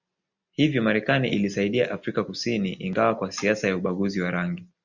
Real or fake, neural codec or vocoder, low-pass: real; none; 7.2 kHz